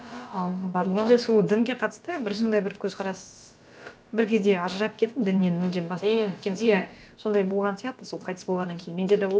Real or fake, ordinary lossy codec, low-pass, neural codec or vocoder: fake; none; none; codec, 16 kHz, about 1 kbps, DyCAST, with the encoder's durations